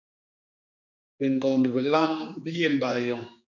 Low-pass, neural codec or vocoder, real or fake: 7.2 kHz; codec, 16 kHz, 2 kbps, X-Codec, HuBERT features, trained on general audio; fake